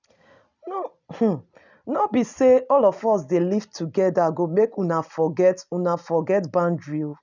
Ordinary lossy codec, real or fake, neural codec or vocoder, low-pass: none; real; none; 7.2 kHz